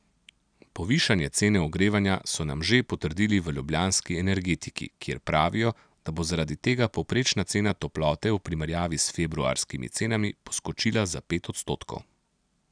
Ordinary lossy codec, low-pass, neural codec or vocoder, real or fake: none; 9.9 kHz; none; real